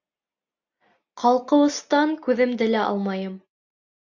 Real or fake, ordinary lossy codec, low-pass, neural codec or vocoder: real; AAC, 48 kbps; 7.2 kHz; none